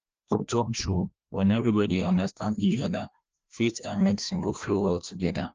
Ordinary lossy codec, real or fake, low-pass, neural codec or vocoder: Opus, 24 kbps; fake; 7.2 kHz; codec, 16 kHz, 1 kbps, FreqCodec, larger model